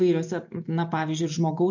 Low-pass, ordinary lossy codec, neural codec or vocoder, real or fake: 7.2 kHz; MP3, 48 kbps; none; real